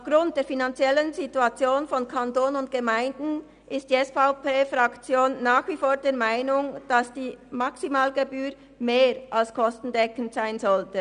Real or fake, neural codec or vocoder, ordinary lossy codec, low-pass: real; none; none; 9.9 kHz